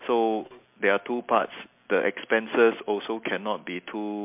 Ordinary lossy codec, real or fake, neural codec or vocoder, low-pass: MP3, 32 kbps; real; none; 3.6 kHz